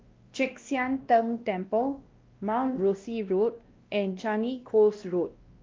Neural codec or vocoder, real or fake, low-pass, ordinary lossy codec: codec, 16 kHz, 1 kbps, X-Codec, WavLM features, trained on Multilingual LibriSpeech; fake; 7.2 kHz; Opus, 24 kbps